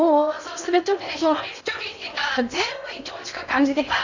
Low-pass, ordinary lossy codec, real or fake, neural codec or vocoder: 7.2 kHz; none; fake; codec, 16 kHz in and 24 kHz out, 0.6 kbps, FocalCodec, streaming, 4096 codes